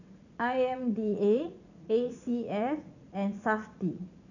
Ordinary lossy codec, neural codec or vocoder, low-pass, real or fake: none; vocoder, 44.1 kHz, 80 mel bands, Vocos; 7.2 kHz; fake